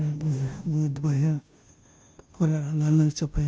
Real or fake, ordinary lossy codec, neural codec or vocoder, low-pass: fake; none; codec, 16 kHz, 0.5 kbps, FunCodec, trained on Chinese and English, 25 frames a second; none